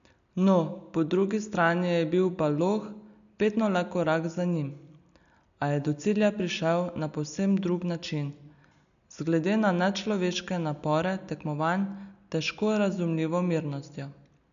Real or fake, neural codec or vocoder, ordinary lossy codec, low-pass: real; none; none; 7.2 kHz